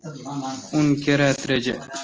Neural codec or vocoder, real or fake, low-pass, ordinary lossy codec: none; real; 7.2 kHz; Opus, 24 kbps